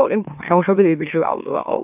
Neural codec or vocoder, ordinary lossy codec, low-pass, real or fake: autoencoder, 44.1 kHz, a latent of 192 numbers a frame, MeloTTS; none; 3.6 kHz; fake